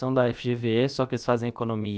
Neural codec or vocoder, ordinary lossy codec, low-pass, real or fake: codec, 16 kHz, about 1 kbps, DyCAST, with the encoder's durations; none; none; fake